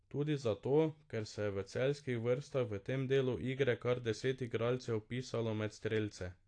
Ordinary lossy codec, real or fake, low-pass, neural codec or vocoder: AAC, 48 kbps; real; 9.9 kHz; none